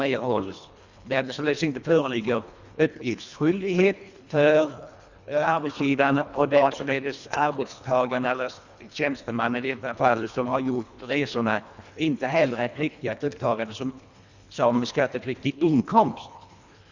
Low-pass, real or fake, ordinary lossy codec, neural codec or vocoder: 7.2 kHz; fake; Opus, 64 kbps; codec, 24 kHz, 1.5 kbps, HILCodec